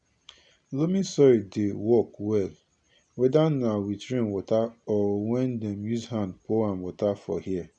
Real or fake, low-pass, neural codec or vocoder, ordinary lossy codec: real; 9.9 kHz; none; none